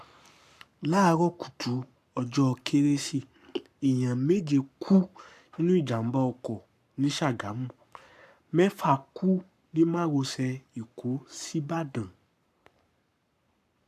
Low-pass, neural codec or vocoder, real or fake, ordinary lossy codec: 14.4 kHz; codec, 44.1 kHz, 7.8 kbps, Pupu-Codec; fake; AAC, 96 kbps